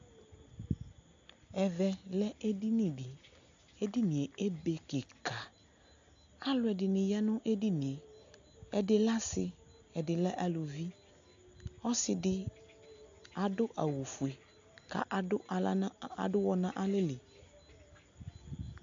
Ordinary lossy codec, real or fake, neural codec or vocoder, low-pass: AAC, 64 kbps; real; none; 7.2 kHz